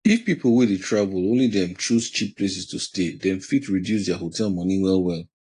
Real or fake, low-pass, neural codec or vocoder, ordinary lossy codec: real; 10.8 kHz; none; AAC, 48 kbps